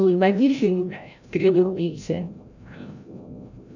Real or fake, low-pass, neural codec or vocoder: fake; 7.2 kHz; codec, 16 kHz, 0.5 kbps, FreqCodec, larger model